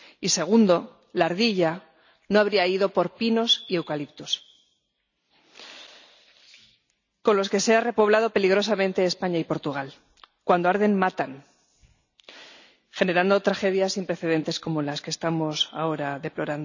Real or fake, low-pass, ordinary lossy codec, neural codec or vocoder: real; 7.2 kHz; none; none